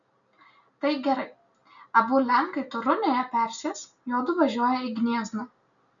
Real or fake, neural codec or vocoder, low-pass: real; none; 7.2 kHz